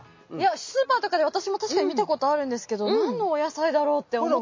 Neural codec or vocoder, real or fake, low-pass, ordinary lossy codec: none; real; 7.2 kHz; MP3, 32 kbps